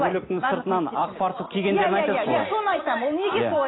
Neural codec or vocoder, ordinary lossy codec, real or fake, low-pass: none; AAC, 16 kbps; real; 7.2 kHz